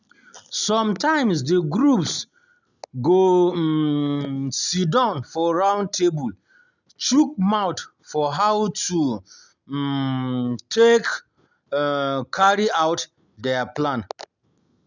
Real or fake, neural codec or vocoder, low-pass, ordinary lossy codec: real; none; 7.2 kHz; none